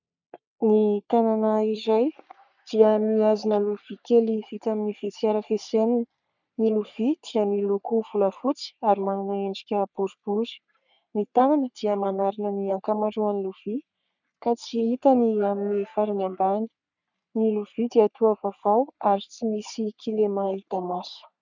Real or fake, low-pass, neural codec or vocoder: fake; 7.2 kHz; codec, 44.1 kHz, 3.4 kbps, Pupu-Codec